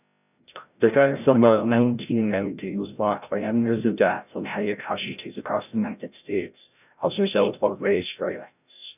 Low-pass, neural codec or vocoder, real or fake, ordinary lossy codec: 3.6 kHz; codec, 16 kHz, 0.5 kbps, FreqCodec, larger model; fake; none